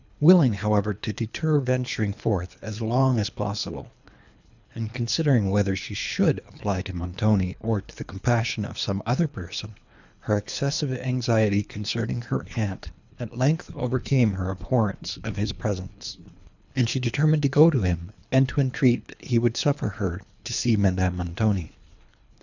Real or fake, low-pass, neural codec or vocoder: fake; 7.2 kHz; codec, 24 kHz, 3 kbps, HILCodec